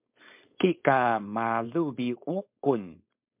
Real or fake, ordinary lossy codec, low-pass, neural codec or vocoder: fake; MP3, 32 kbps; 3.6 kHz; codec, 16 kHz, 4.8 kbps, FACodec